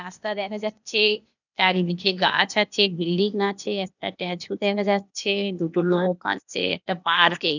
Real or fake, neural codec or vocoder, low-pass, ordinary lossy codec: fake; codec, 16 kHz, 0.8 kbps, ZipCodec; 7.2 kHz; none